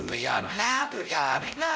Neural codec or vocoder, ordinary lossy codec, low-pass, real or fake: codec, 16 kHz, 0.5 kbps, X-Codec, WavLM features, trained on Multilingual LibriSpeech; none; none; fake